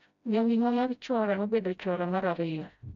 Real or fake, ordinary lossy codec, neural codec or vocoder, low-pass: fake; none; codec, 16 kHz, 0.5 kbps, FreqCodec, smaller model; 7.2 kHz